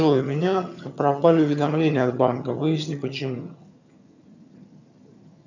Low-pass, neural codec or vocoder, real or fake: 7.2 kHz; vocoder, 22.05 kHz, 80 mel bands, HiFi-GAN; fake